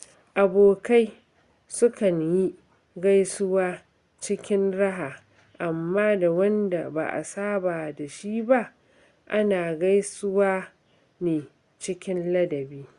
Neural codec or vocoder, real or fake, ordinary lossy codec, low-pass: none; real; Opus, 64 kbps; 10.8 kHz